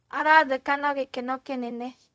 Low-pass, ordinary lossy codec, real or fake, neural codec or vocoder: none; none; fake; codec, 16 kHz, 0.4 kbps, LongCat-Audio-Codec